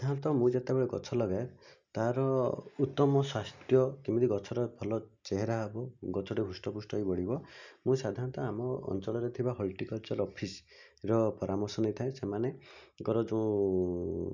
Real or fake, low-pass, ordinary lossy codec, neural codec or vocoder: real; 7.2 kHz; none; none